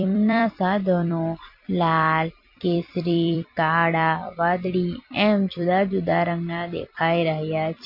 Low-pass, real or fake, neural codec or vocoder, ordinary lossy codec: 5.4 kHz; fake; vocoder, 44.1 kHz, 128 mel bands every 256 samples, BigVGAN v2; MP3, 32 kbps